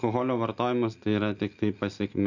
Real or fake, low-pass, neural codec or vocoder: real; 7.2 kHz; none